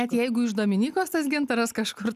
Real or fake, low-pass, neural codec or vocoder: real; 14.4 kHz; none